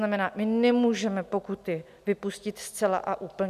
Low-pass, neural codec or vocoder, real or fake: 14.4 kHz; none; real